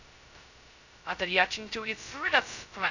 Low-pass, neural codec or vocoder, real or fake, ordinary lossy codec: 7.2 kHz; codec, 16 kHz, 0.2 kbps, FocalCodec; fake; none